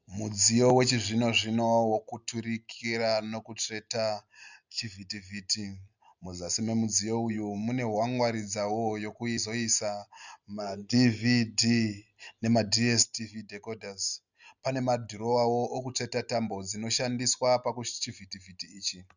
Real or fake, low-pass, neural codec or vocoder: real; 7.2 kHz; none